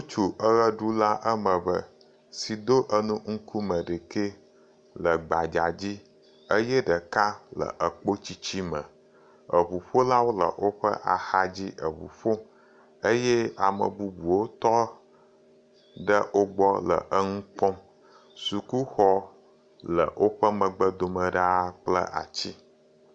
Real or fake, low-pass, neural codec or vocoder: real; 9.9 kHz; none